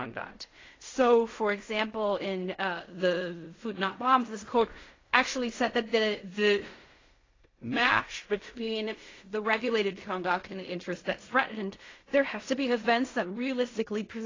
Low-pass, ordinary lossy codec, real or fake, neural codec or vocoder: 7.2 kHz; AAC, 32 kbps; fake; codec, 16 kHz in and 24 kHz out, 0.4 kbps, LongCat-Audio-Codec, fine tuned four codebook decoder